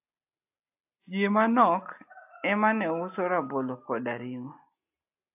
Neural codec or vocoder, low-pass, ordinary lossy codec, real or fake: none; 3.6 kHz; AAC, 32 kbps; real